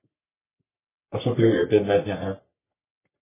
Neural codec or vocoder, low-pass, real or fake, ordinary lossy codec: codec, 44.1 kHz, 3.4 kbps, Pupu-Codec; 3.6 kHz; fake; MP3, 16 kbps